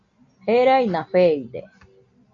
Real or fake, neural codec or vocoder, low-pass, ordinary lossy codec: real; none; 7.2 kHz; MP3, 48 kbps